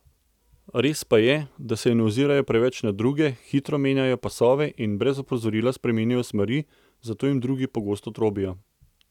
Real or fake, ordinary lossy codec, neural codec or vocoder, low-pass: real; none; none; 19.8 kHz